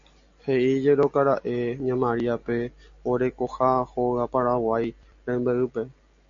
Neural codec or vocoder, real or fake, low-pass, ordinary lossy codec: none; real; 7.2 kHz; AAC, 64 kbps